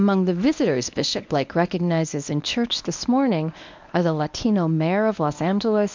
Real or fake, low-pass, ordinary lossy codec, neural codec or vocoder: fake; 7.2 kHz; MP3, 64 kbps; codec, 24 kHz, 0.9 kbps, WavTokenizer, medium speech release version 1